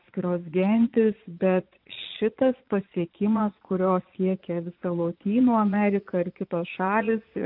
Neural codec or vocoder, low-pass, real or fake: vocoder, 22.05 kHz, 80 mel bands, Vocos; 5.4 kHz; fake